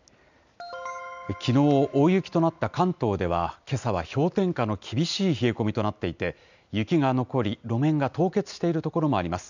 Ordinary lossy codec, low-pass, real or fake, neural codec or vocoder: none; 7.2 kHz; real; none